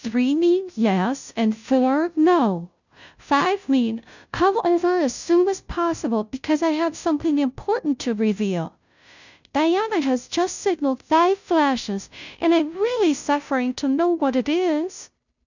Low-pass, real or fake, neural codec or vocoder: 7.2 kHz; fake; codec, 16 kHz, 0.5 kbps, FunCodec, trained on Chinese and English, 25 frames a second